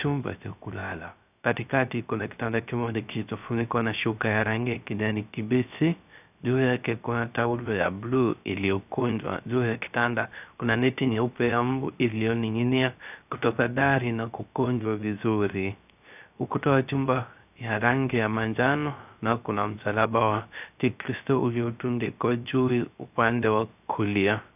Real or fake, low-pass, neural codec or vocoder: fake; 3.6 kHz; codec, 16 kHz, 0.3 kbps, FocalCodec